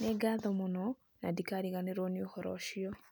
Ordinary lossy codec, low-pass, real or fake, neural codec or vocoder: none; none; real; none